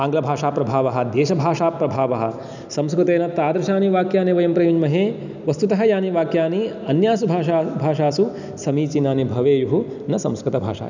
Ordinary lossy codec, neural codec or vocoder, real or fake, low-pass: none; none; real; 7.2 kHz